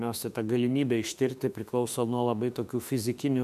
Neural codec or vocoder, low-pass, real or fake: autoencoder, 48 kHz, 32 numbers a frame, DAC-VAE, trained on Japanese speech; 14.4 kHz; fake